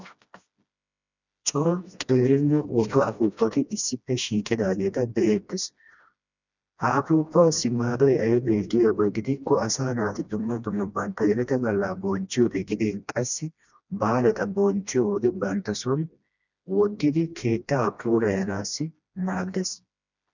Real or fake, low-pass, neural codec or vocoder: fake; 7.2 kHz; codec, 16 kHz, 1 kbps, FreqCodec, smaller model